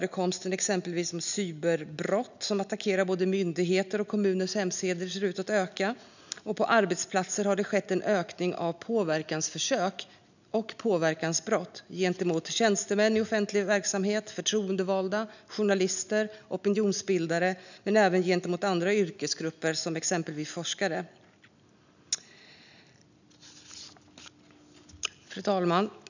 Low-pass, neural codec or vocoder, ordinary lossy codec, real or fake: 7.2 kHz; none; none; real